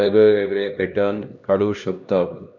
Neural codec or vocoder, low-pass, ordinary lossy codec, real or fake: codec, 16 kHz, 1 kbps, X-Codec, HuBERT features, trained on LibriSpeech; 7.2 kHz; none; fake